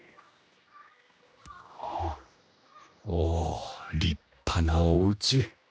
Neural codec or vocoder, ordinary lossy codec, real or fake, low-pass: codec, 16 kHz, 1 kbps, X-Codec, HuBERT features, trained on general audio; none; fake; none